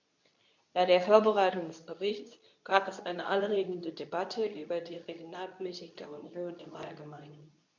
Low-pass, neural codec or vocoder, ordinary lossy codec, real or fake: 7.2 kHz; codec, 24 kHz, 0.9 kbps, WavTokenizer, medium speech release version 2; none; fake